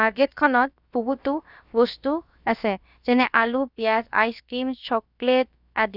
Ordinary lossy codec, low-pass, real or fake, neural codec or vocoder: none; 5.4 kHz; fake; codec, 16 kHz, about 1 kbps, DyCAST, with the encoder's durations